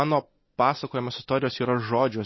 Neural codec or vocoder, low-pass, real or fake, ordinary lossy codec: none; 7.2 kHz; real; MP3, 24 kbps